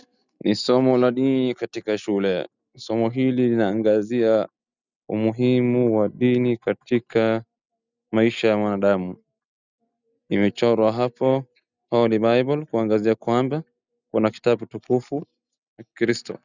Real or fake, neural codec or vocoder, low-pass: real; none; 7.2 kHz